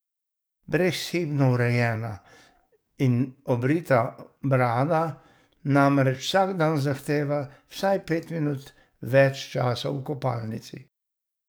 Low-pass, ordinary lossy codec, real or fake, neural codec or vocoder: none; none; fake; codec, 44.1 kHz, 7.8 kbps, DAC